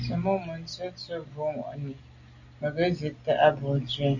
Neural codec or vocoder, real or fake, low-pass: none; real; 7.2 kHz